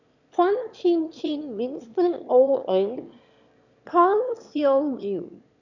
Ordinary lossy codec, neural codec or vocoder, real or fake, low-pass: none; autoencoder, 22.05 kHz, a latent of 192 numbers a frame, VITS, trained on one speaker; fake; 7.2 kHz